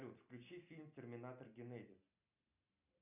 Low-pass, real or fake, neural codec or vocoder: 3.6 kHz; real; none